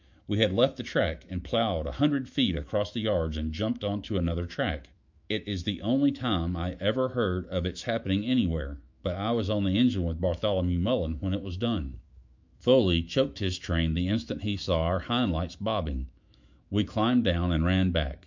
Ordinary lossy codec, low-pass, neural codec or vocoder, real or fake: MP3, 64 kbps; 7.2 kHz; autoencoder, 48 kHz, 128 numbers a frame, DAC-VAE, trained on Japanese speech; fake